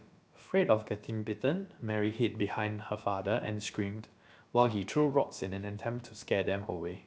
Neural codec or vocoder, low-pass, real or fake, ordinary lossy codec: codec, 16 kHz, about 1 kbps, DyCAST, with the encoder's durations; none; fake; none